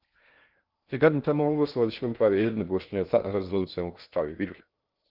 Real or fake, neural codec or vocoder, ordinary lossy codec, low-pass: fake; codec, 16 kHz in and 24 kHz out, 0.6 kbps, FocalCodec, streaming, 2048 codes; Opus, 32 kbps; 5.4 kHz